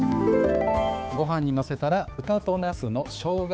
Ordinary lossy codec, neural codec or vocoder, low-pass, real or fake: none; codec, 16 kHz, 4 kbps, X-Codec, HuBERT features, trained on balanced general audio; none; fake